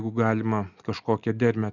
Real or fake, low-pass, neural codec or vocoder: real; 7.2 kHz; none